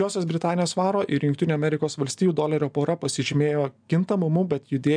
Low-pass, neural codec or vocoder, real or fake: 9.9 kHz; none; real